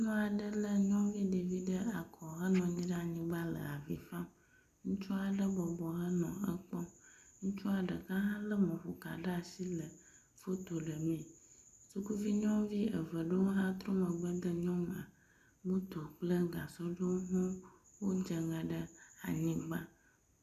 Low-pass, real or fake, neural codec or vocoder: 14.4 kHz; real; none